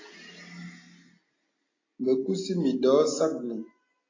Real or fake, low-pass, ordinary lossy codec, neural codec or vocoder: real; 7.2 kHz; AAC, 32 kbps; none